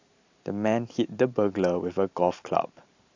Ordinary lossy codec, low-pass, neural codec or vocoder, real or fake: AAC, 48 kbps; 7.2 kHz; vocoder, 44.1 kHz, 128 mel bands every 512 samples, BigVGAN v2; fake